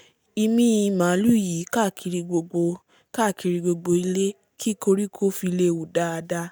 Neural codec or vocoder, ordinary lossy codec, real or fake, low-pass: none; none; real; none